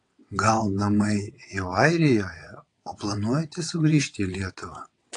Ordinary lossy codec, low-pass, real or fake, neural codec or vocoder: AAC, 48 kbps; 9.9 kHz; fake; vocoder, 22.05 kHz, 80 mel bands, WaveNeXt